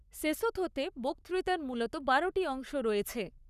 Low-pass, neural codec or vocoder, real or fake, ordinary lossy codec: 14.4 kHz; codec, 44.1 kHz, 7.8 kbps, Pupu-Codec; fake; none